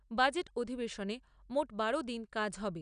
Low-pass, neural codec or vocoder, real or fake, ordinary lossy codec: 9.9 kHz; none; real; none